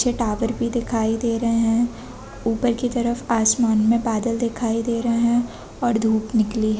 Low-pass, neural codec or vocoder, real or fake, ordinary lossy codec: none; none; real; none